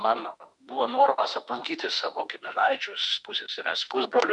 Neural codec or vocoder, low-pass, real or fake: autoencoder, 48 kHz, 32 numbers a frame, DAC-VAE, trained on Japanese speech; 10.8 kHz; fake